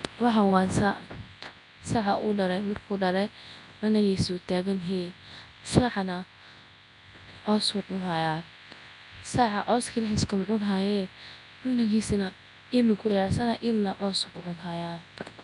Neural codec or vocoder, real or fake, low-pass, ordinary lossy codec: codec, 24 kHz, 0.9 kbps, WavTokenizer, large speech release; fake; 10.8 kHz; none